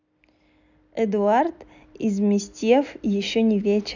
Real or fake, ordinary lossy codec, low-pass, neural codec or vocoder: real; none; 7.2 kHz; none